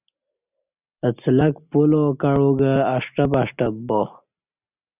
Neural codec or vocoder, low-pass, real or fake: none; 3.6 kHz; real